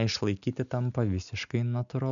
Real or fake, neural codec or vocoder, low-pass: real; none; 7.2 kHz